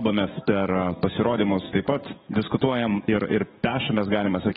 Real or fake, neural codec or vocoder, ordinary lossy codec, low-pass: fake; codec, 44.1 kHz, 7.8 kbps, DAC; AAC, 16 kbps; 19.8 kHz